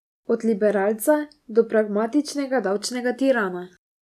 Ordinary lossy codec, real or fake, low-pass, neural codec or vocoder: none; real; 14.4 kHz; none